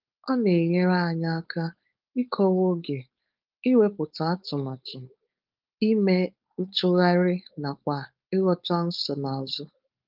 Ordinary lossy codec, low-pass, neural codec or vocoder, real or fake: Opus, 32 kbps; 5.4 kHz; codec, 16 kHz, 4.8 kbps, FACodec; fake